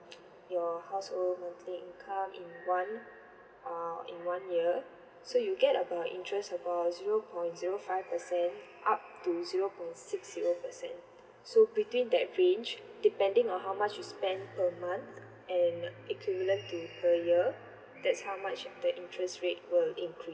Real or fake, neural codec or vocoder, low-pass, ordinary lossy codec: real; none; none; none